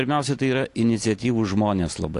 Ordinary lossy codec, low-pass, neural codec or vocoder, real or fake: AAC, 48 kbps; 10.8 kHz; none; real